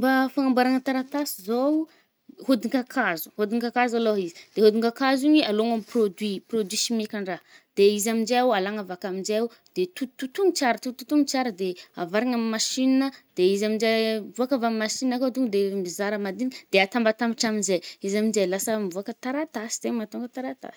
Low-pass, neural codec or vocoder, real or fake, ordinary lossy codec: none; none; real; none